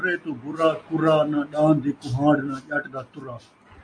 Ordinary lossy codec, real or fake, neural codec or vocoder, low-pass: MP3, 48 kbps; real; none; 9.9 kHz